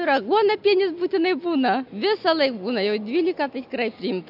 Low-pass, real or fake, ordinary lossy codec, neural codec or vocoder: 5.4 kHz; real; AAC, 48 kbps; none